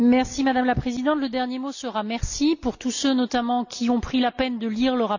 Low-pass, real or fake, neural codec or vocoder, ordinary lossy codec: 7.2 kHz; real; none; none